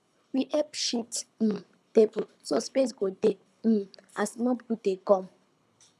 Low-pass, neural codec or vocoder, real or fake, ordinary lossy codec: none; codec, 24 kHz, 6 kbps, HILCodec; fake; none